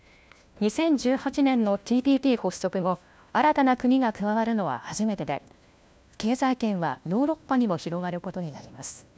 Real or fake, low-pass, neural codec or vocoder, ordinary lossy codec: fake; none; codec, 16 kHz, 1 kbps, FunCodec, trained on LibriTTS, 50 frames a second; none